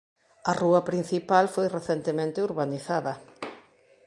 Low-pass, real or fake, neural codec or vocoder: 10.8 kHz; real; none